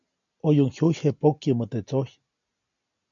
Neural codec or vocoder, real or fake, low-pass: none; real; 7.2 kHz